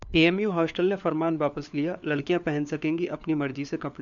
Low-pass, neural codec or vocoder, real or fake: 7.2 kHz; codec, 16 kHz, 4 kbps, FunCodec, trained on LibriTTS, 50 frames a second; fake